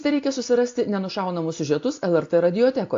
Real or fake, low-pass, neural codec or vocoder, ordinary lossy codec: real; 7.2 kHz; none; AAC, 48 kbps